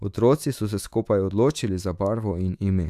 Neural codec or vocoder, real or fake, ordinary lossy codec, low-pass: autoencoder, 48 kHz, 128 numbers a frame, DAC-VAE, trained on Japanese speech; fake; none; 14.4 kHz